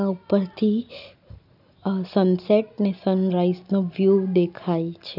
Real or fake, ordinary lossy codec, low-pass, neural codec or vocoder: real; none; 5.4 kHz; none